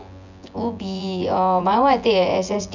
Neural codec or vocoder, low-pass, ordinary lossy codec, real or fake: vocoder, 24 kHz, 100 mel bands, Vocos; 7.2 kHz; none; fake